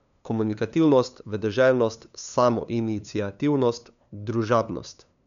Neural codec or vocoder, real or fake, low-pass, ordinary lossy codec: codec, 16 kHz, 2 kbps, FunCodec, trained on LibriTTS, 25 frames a second; fake; 7.2 kHz; none